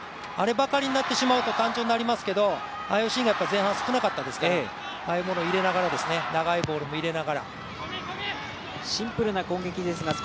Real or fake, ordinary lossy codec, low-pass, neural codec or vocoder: real; none; none; none